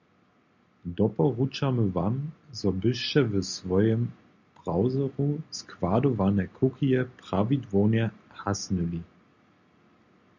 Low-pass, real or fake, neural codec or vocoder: 7.2 kHz; real; none